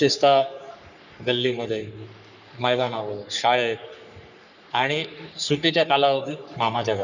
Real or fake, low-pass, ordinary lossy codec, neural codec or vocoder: fake; 7.2 kHz; none; codec, 44.1 kHz, 3.4 kbps, Pupu-Codec